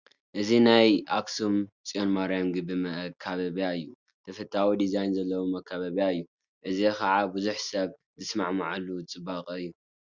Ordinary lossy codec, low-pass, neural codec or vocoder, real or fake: Opus, 64 kbps; 7.2 kHz; none; real